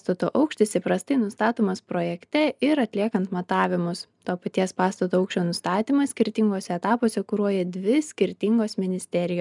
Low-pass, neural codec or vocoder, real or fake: 10.8 kHz; none; real